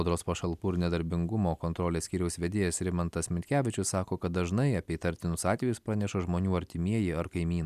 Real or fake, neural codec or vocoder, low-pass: real; none; 14.4 kHz